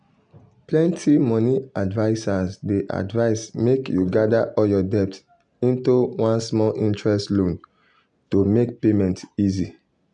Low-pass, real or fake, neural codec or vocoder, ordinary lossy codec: 9.9 kHz; real; none; none